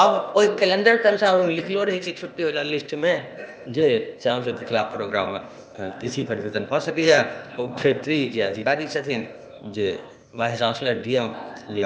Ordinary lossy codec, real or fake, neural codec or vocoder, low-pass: none; fake; codec, 16 kHz, 0.8 kbps, ZipCodec; none